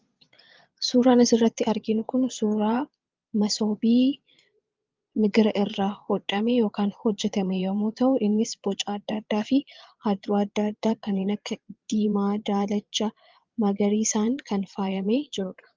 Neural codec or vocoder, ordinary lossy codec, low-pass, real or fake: vocoder, 22.05 kHz, 80 mel bands, Vocos; Opus, 32 kbps; 7.2 kHz; fake